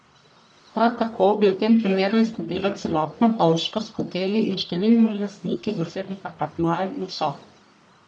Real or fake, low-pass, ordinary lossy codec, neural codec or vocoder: fake; 9.9 kHz; none; codec, 44.1 kHz, 1.7 kbps, Pupu-Codec